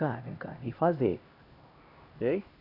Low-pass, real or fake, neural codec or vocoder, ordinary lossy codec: 5.4 kHz; fake; codec, 16 kHz, 1 kbps, X-Codec, HuBERT features, trained on LibriSpeech; none